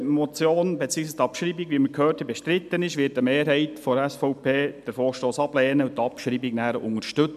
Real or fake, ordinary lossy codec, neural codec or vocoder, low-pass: real; MP3, 96 kbps; none; 14.4 kHz